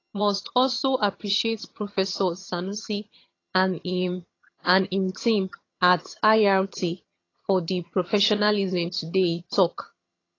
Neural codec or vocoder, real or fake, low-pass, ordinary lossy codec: vocoder, 22.05 kHz, 80 mel bands, HiFi-GAN; fake; 7.2 kHz; AAC, 32 kbps